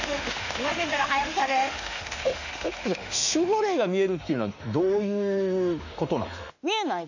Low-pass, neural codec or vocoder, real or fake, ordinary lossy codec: 7.2 kHz; autoencoder, 48 kHz, 32 numbers a frame, DAC-VAE, trained on Japanese speech; fake; none